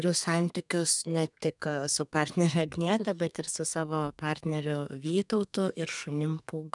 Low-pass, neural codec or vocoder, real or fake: 10.8 kHz; codec, 32 kHz, 1.9 kbps, SNAC; fake